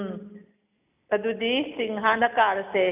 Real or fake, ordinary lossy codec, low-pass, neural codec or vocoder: real; none; 3.6 kHz; none